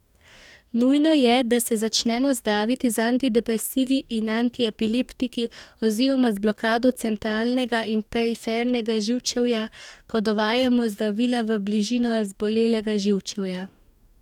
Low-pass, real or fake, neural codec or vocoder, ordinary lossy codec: 19.8 kHz; fake; codec, 44.1 kHz, 2.6 kbps, DAC; none